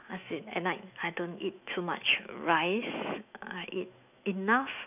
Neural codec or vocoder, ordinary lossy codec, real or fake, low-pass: autoencoder, 48 kHz, 128 numbers a frame, DAC-VAE, trained on Japanese speech; none; fake; 3.6 kHz